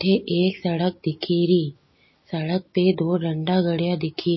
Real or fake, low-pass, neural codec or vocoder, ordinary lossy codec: real; 7.2 kHz; none; MP3, 24 kbps